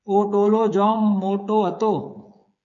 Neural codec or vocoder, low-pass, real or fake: codec, 16 kHz, 8 kbps, FreqCodec, smaller model; 7.2 kHz; fake